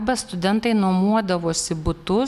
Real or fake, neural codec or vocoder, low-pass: real; none; 14.4 kHz